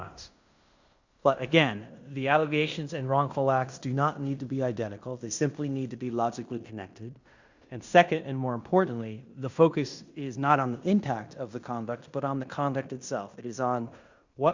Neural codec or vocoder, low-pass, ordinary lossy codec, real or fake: codec, 16 kHz in and 24 kHz out, 0.9 kbps, LongCat-Audio-Codec, fine tuned four codebook decoder; 7.2 kHz; Opus, 64 kbps; fake